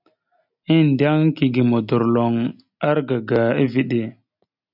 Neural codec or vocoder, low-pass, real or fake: none; 5.4 kHz; real